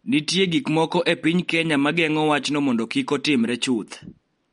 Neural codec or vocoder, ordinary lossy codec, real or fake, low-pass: none; MP3, 48 kbps; real; 10.8 kHz